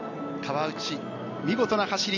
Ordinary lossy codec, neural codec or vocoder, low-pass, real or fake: none; none; 7.2 kHz; real